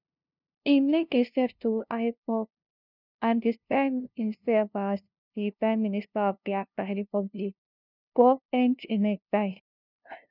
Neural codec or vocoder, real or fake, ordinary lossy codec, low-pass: codec, 16 kHz, 0.5 kbps, FunCodec, trained on LibriTTS, 25 frames a second; fake; none; 5.4 kHz